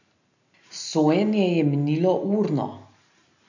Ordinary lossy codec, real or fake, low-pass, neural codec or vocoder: none; real; 7.2 kHz; none